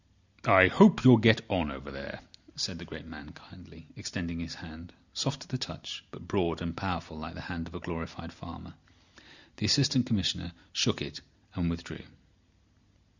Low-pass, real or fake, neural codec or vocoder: 7.2 kHz; real; none